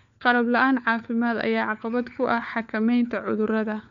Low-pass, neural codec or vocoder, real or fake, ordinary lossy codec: 7.2 kHz; codec, 16 kHz, 4 kbps, FunCodec, trained on LibriTTS, 50 frames a second; fake; none